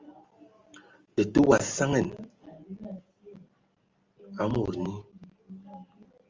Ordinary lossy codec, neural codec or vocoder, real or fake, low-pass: Opus, 32 kbps; none; real; 7.2 kHz